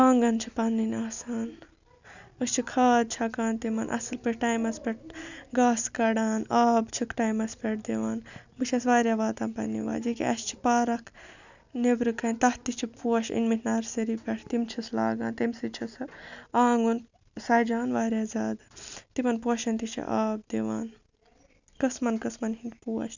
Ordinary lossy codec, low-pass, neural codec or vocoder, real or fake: none; 7.2 kHz; none; real